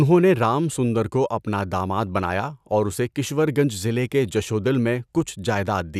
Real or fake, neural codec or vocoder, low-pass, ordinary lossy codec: real; none; 14.4 kHz; none